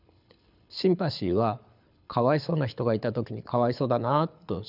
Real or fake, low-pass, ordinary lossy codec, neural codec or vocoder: fake; 5.4 kHz; none; codec, 24 kHz, 6 kbps, HILCodec